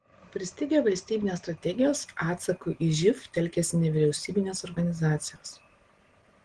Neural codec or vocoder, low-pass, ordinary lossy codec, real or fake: none; 9.9 kHz; Opus, 16 kbps; real